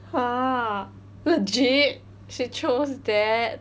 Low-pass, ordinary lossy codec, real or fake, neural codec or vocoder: none; none; real; none